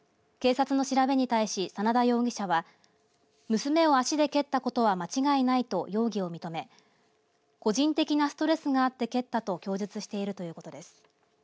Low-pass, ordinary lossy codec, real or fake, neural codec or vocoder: none; none; real; none